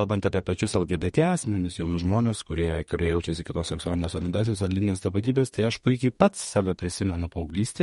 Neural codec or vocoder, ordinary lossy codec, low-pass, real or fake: codec, 32 kHz, 1.9 kbps, SNAC; MP3, 48 kbps; 14.4 kHz; fake